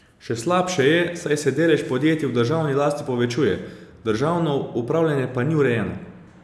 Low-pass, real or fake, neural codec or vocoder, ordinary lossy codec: none; real; none; none